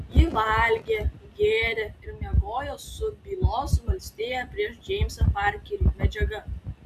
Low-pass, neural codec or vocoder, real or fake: 14.4 kHz; none; real